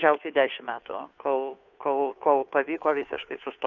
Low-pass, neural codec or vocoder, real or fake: 7.2 kHz; codec, 16 kHz, 2 kbps, FunCodec, trained on Chinese and English, 25 frames a second; fake